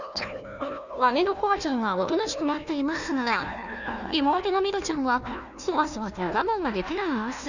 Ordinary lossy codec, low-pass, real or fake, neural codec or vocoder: none; 7.2 kHz; fake; codec, 16 kHz, 1 kbps, FunCodec, trained on Chinese and English, 50 frames a second